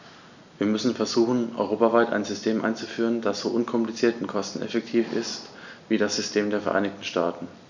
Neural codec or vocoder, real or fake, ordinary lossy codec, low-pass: none; real; none; 7.2 kHz